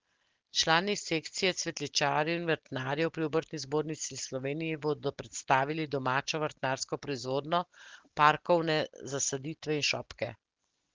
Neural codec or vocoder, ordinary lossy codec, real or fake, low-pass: none; Opus, 16 kbps; real; 7.2 kHz